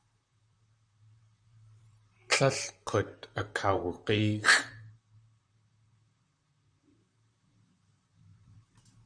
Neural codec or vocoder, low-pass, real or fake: vocoder, 44.1 kHz, 128 mel bands, Pupu-Vocoder; 9.9 kHz; fake